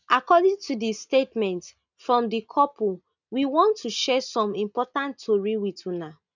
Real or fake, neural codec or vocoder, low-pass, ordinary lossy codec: real; none; 7.2 kHz; none